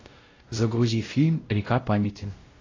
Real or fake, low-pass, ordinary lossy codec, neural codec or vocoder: fake; 7.2 kHz; AAC, 32 kbps; codec, 16 kHz, 0.5 kbps, X-Codec, WavLM features, trained on Multilingual LibriSpeech